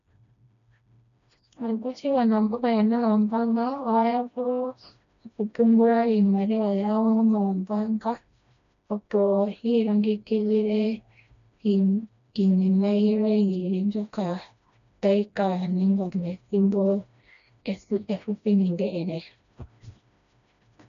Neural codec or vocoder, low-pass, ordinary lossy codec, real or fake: codec, 16 kHz, 1 kbps, FreqCodec, smaller model; 7.2 kHz; AAC, 96 kbps; fake